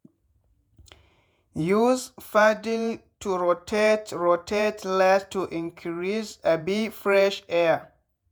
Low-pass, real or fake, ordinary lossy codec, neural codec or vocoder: none; fake; none; vocoder, 48 kHz, 128 mel bands, Vocos